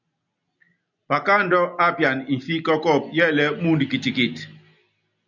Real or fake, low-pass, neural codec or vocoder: real; 7.2 kHz; none